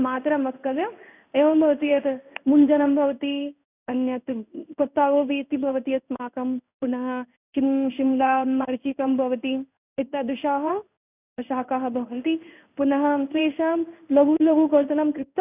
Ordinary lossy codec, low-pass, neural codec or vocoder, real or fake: none; 3.6 kHz; codec, 16 kHz in and 24 kHz out, 1 kbps, XY-Tokenizer; fake